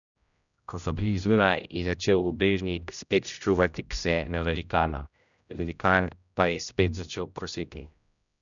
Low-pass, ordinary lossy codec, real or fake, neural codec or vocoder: 7.2 kHz; none; fake; codec, 16 kHz, 0.5 kbps, X-Codec, HuBERT features, trained on general audio